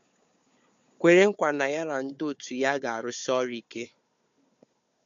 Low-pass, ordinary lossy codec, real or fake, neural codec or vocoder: 7.2 kHz; MP3, 64 kbps; fake; codec, 16 kHz, 4 kbps, FunCodec, trained on Chinese and English, 50 frames a second